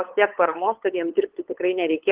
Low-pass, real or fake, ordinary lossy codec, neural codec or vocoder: 3.6 kHz; fake; Opus, 16 kbps; codec, 16 kHz, 8 kbps, FunCodec, trained on LibriTTS, 25 frames a second